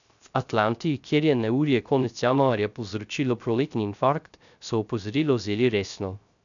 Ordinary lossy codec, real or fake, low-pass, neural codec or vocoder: none; fake; 7.2 kHz; codec, 16 kHz, 0.3 kbps, FocalCodec